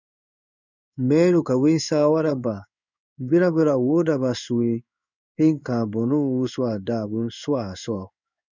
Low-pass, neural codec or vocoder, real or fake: 7.2 kHz; codec, 16 kHz in and 24 kHz out, 1 kbps, XY-Tokenizer; fake